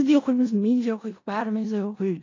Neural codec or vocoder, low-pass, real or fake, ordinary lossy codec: codec, 16 kHz in and 24 kHz out, 0.4 kbps, LongCat-Audio-Codec, four codebook decoder; 7.2 kHz; fake; AAC, 32 kbps